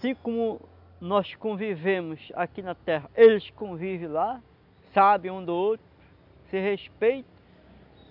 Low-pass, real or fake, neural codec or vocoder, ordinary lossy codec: 5.4 kHz; real; none; none